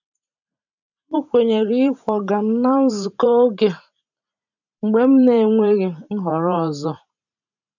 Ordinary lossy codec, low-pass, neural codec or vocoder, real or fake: none; 7.2 kHz; vocoder, 44.1 kHz, 128 mel bands every 512 samples, BigVGAN v2; fake